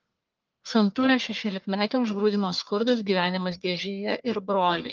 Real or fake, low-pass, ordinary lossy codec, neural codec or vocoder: fake; 7.2 kHz; Opus, 24 kbps; codec, 44.1 kHz, 1.7 kbps, Pupu-Codec